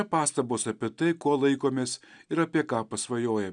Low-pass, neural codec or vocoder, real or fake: 9.9 kHz; none; real